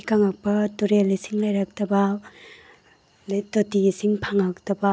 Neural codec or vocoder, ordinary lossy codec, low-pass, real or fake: none; none; none; real